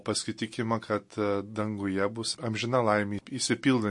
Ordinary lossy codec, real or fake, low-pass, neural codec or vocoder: MP3, 48 kbps; real; 10.8 kHz; none